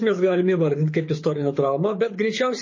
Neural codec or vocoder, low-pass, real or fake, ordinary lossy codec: codec, 24 kHz, 6 kbps, HILCodec; 7.2 kHz; fake; MP3, 32 kbps